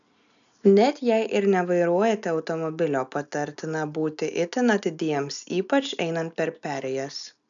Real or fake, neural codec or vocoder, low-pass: real; none; 7.2 kHz